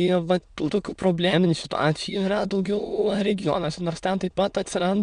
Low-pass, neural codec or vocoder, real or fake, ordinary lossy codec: 9.9 kHz; autoencoder, 22.05 kHz, a latent of 192 numbers a frame, VITS, trained on many speakers; fake; MP3, 96 kbps